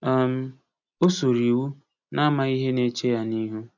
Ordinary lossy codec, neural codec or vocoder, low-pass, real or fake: none; none; 7.2 kHz; real